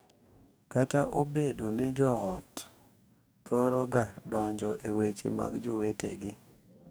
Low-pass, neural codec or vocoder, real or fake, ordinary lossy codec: none; codec, 44.1 kHz, 2.6 kbps, DAC; fake; none